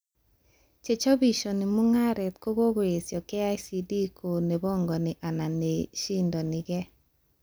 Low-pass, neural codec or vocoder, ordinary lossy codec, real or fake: none; none; none; real